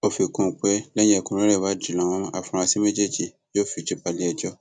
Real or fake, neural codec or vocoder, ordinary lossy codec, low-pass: real; none; none; none